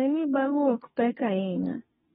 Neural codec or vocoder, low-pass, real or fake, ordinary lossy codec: codec, 32 kHz, 1.9 kbps, SNAC; 14.4 kHz; fake; AAC, 16 kbps